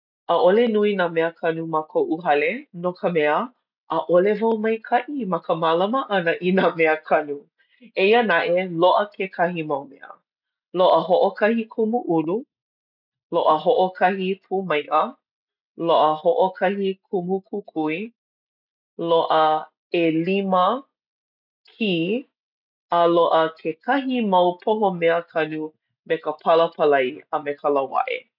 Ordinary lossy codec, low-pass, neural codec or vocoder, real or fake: none; 5.4 kHz; none; real